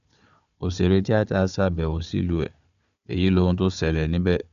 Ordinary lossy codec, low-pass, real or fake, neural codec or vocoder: AAC, 96 kbps; 7.2 kHz; fake; codec, 16 kHz, 4 kbps, FunCodec, trained on Chinese and English, 50 frames a second